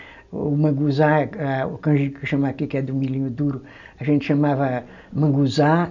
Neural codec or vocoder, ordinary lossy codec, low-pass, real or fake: none; none; 7.2 kHz; real